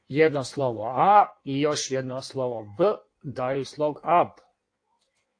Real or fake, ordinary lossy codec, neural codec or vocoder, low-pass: fake; AAC, 48 kbps; codec, 16 kHz in and 24 kHz out, 1.1 kbps, FireRedTTS-2 codec; 9.9 kHz